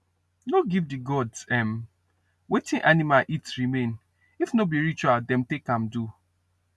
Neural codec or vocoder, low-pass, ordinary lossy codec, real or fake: none; none; none; real